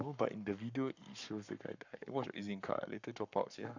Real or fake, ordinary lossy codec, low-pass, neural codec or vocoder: fake; none; 7.2 kHz; codec, 44.1 kHz, 7.8 kbps, Pupu-Codec